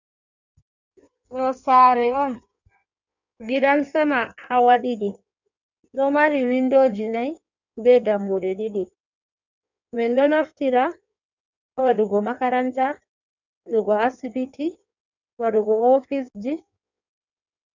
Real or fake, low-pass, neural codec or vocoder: fake; 7.2 kHz; codec, 16 kHz in and 24 kHz out, 1.1 kbps, FireRedTTS-2 codec